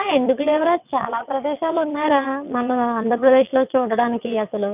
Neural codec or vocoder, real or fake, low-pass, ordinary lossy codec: vocoder, 22.05 kHz, 80 mel bands, WaveNeXt; fake; 3.6 kHz; none